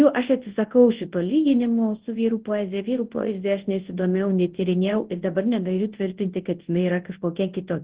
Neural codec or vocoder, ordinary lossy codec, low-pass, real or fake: codec, 24 kHz, 0.9 kbps, WavTokenizer, large speech release; Opus, 16 kbps; 3.6 kHz; fake